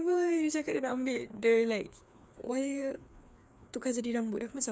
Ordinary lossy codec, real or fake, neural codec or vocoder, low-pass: none; fake; codec, 16 kHz, 2 kbps, FreqCodec, larger model; none